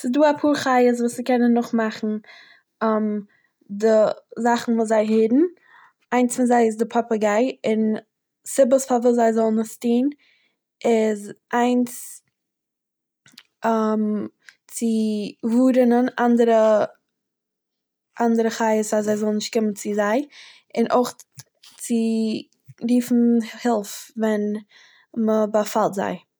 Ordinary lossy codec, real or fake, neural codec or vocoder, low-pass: none; real; none; none